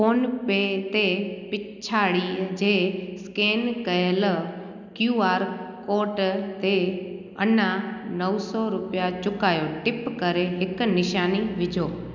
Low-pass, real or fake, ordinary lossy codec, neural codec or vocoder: 7.2 kHz; real; none; none